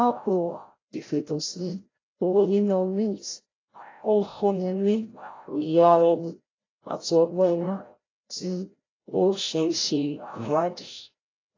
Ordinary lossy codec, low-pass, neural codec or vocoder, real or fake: AAC, 32 kbps; 7.2 kHz; codec, 16 kHz, 0.5 kbps, FreqCodec, larger model; fake